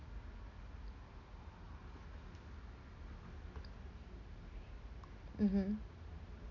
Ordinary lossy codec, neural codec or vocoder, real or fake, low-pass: none; none; real; 7.2 kHz